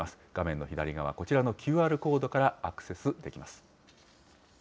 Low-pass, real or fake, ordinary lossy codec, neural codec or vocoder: none; real; none; none